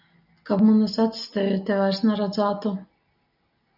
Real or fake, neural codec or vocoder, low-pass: real; none; 5.4 kHz